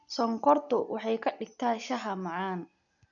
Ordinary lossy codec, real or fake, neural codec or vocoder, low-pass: none; real; none; 7.2 kHz